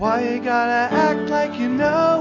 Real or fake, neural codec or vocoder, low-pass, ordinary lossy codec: real; none; 7.2 kHz; AAC, 48 kbps